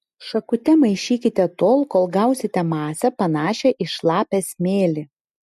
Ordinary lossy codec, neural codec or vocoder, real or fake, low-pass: MP3, 64 kbps; none; real; 14.4 kHz